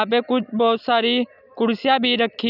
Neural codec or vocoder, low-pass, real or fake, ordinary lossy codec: none; 5.4 kHz; real; none